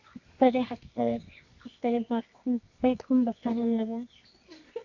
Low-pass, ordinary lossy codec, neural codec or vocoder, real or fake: 7.2 kHz; MP3, 64 kbps; codec, 24 kHz, 0.9 kbps, WavTokenizer, medium music audio release; fake